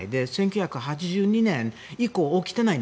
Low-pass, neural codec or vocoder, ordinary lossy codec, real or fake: none; none; none; real